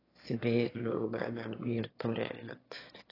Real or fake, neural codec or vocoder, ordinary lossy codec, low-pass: fake; autoencoder, 22.05 kHz, a latent of 192 numbers a frame, VITS, trained on one speaker; AAC, 24 kbps; 5.4 kHz